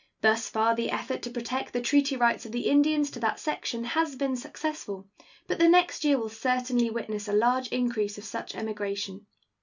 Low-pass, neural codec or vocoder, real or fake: 7.2 kHz; none; real